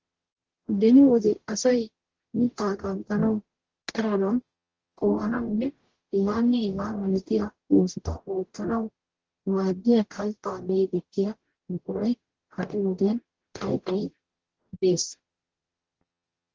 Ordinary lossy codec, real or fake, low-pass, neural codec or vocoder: Opus, 16 kbps; fake; 7.2 kHz; codec, 44.1 kHz, 0.9 kbps, DAC